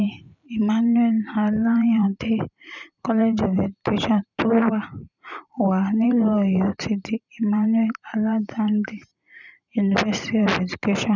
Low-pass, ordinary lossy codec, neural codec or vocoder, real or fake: 7.2 kHz; none; vocoder, 44.1 kHz, 128 mel bands every 512 samples, BigVGAN v2; fake